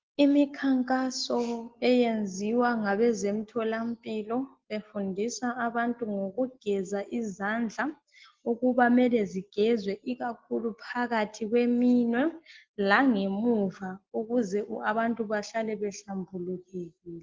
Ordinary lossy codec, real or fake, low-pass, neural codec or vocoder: Opus, 16 kbps; real; 7.2 kHz; none